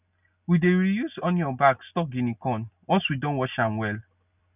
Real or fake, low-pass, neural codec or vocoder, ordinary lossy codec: real; 3.6 kHz; none; none